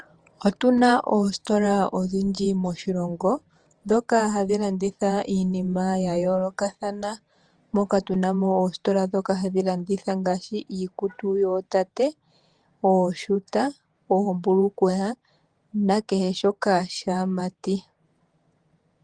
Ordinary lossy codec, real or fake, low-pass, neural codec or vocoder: Opus, 24 kbps; fake; 9.9 kHz; vocoder, 48 kHz, 128 mel bands, Vocos